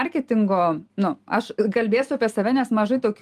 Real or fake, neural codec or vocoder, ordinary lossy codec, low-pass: real; none; Opus, 32 kbps; 14.4 kHz